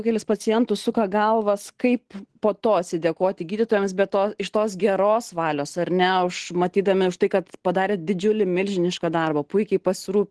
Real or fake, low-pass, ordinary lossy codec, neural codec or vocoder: real; 10.8 kHz; Opus, 16 kbps; none